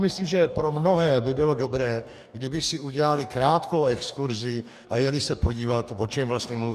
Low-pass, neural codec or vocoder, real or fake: 14.4 kHz; codec, 44.1 kHz, 2.6 kbps, DAC; fake